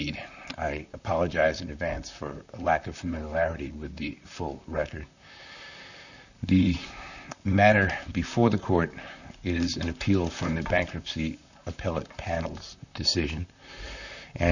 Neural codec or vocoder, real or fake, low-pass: vocoder, 44.1 kHz, 128 mel bands, Pupu-Vocoder; fake; 7.2 kHz